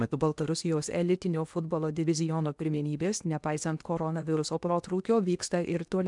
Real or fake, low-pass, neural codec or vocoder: fake; 10.8 kHz; codec, 16 kHz in and 24 kHz out, 0.8 kbps, FocalCodec, streaming, 65536 codes